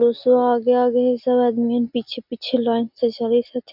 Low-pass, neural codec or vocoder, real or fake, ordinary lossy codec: 5.4 kHz; none; real; none